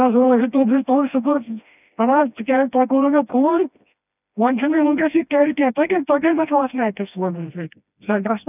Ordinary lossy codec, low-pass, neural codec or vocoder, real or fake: none; 3.6 kHz; codec, 16 kHz, 1 kbps, FreqCodec, smaller model; fake